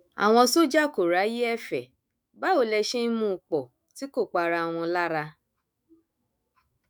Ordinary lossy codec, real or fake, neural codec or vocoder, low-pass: none; fake; autoencoder, 48 kHz, 128 numbers a frame, DAC-VAE, trained on Japanese speech; none